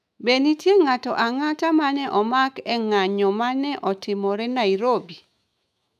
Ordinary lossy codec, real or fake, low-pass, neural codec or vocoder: none; fake; 14.4 kHz; autoencoder, 48 kHz, 128 numbers a frame, DAC-VAE, trained on Japanese speech